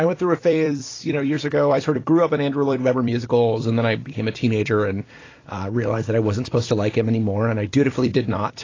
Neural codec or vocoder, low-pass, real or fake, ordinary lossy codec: vocoder, 44.1 kHz, 128 mel bands every 256 samples, BigVGAN v2; 7.2 kHz; fake; AAC, 32 kbps